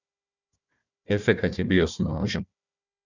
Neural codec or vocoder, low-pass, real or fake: codec, 16 kHz, 1 kbps, FunCodec, trained on Chinese and English, 50 frames a second; 7.2 kHz; fake